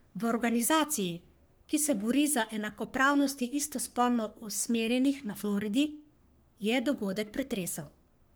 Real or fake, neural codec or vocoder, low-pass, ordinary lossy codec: fake; codec, 44.1 kHz, 3.4 kbps, Pupu-Codec; none; none